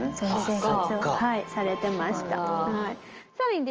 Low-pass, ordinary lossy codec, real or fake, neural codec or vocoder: 7.2 kHz; Opus, 24 kbps; real; none